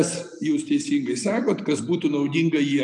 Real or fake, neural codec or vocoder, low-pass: real; none; 10.8 kHz